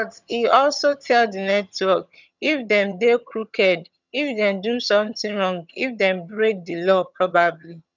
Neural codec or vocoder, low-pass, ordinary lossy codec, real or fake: vocoder, 22.05 kHz, 80 mel bands, HiFi-GAN; 7.2 kHz; none; fake